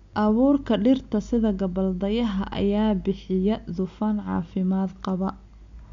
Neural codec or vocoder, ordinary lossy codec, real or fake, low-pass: none; MP3, 64 kbps; real; 7.2 kHz